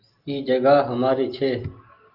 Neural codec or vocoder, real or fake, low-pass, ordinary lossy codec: none; real; 5.4 kHz; Opus, 24 kbps